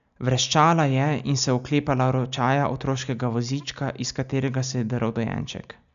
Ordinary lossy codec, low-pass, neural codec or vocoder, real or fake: none; 7.2 kHz; none; real